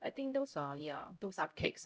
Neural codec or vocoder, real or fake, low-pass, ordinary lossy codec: codec, 16 kHz, 0.5 kbps, X-Codec, HuBERT features, trained on LibriSpeech; fake; none; none